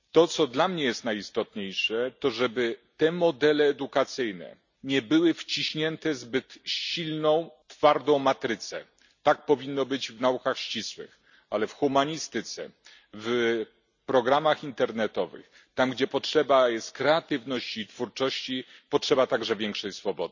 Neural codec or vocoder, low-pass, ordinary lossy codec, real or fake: none; 7.2 kHz; none; real